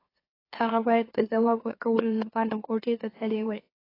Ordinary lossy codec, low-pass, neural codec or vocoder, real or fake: AAC, 24 kbps; 5.4 kHz; autoencoder, 44.1 kHz, a latent of 192 numbers a frame, MeloTTS; fake